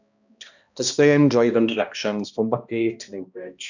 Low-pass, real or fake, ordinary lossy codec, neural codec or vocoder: 7.2 kHz; fake; none; codec, 16 kHz, 1 kbps, X-Codec, HuBERT features, trained on balanced general audio